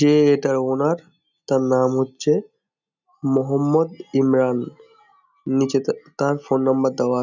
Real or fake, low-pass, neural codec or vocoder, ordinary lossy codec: real; 7.2 kHz; none; none